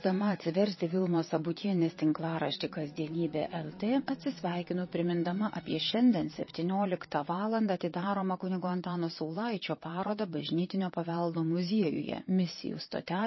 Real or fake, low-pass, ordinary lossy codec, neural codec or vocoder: fake; 7.2 kHz; MP3, 24 kbps; vocoder, 22.05 kHz, 80 mel bands, Vocos